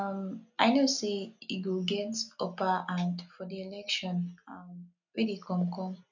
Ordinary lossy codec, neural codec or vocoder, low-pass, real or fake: none; none; 7.2 kHz; real